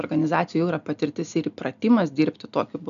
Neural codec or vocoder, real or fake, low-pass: none; real; 7.2 kHz